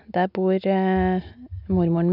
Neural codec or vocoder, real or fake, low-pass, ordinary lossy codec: none; real; 5.4 kHz; none